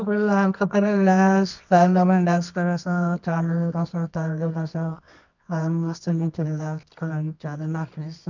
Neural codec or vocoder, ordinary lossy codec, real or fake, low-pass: codec, 24 kHz, 0.9 kbps, WavTokenizer, medium music audio release; none; fake; 7.2 kHz